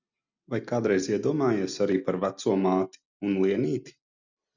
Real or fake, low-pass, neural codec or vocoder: real; 7.2 kHz; none